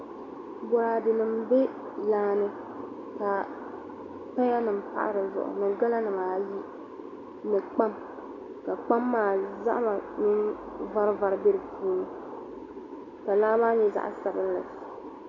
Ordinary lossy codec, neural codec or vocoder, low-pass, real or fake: AAC, 48 kbps; none; 7.2 kHz; real